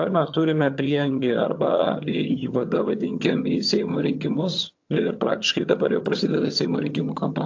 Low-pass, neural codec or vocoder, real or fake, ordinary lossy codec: 7.2 kHz; vocoder, 22.05 kHz, 80 mel bands, HiFi-GAN; fake; AAC, 48 kbps